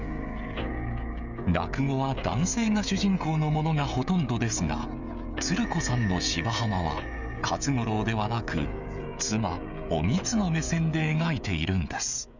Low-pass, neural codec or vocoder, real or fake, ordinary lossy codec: 7.2 kHz; codec, 16 kHz, 16 kbps, FreqCodec, smaller model; fake; none